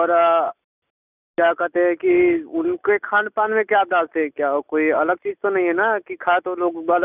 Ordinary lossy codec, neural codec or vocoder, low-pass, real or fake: none; none; 3.6 kHz; real